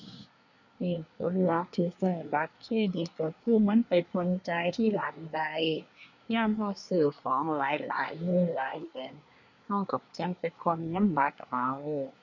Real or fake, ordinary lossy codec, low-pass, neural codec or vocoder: fake; none; 7.2 kHz; codec, 24 kHz, 1 kbps, SNAC